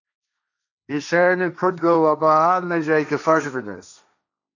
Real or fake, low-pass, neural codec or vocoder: fake; 7.2 kHz; codec, 16 kHz, 1.1 kbps, Voila-Tokenizer